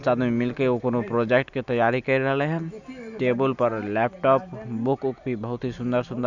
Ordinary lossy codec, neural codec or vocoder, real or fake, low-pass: none; none; real; 7.2 kHz